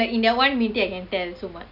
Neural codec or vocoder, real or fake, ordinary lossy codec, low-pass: none; real; none; 5.4 kHz